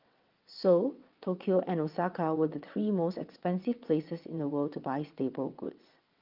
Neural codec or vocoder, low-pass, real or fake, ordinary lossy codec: vocoder, 22.05 kHz, 80 mel bands, WaveNeXt; 5.4 kHz; fake; Opus, 24 kbps